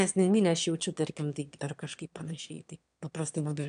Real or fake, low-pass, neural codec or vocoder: fake; 9.9 kHz; autoencoder, 22.05 kHz, a latent of 192 numbers a frame, VITS, trained on one speaker